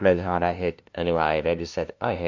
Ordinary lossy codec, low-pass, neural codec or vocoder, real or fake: MP3, 48 kbps; 7.2 kHz; codec, 16 kHz, 0.5 kbps, FunCodec, trained on LibriTTS, 25 frames a second; fake